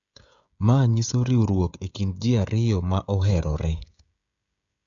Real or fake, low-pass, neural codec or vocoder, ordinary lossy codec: fake; 7.2 kHz; codec, 16 kHz, 16 kbps, FreqCodec, smaller model; none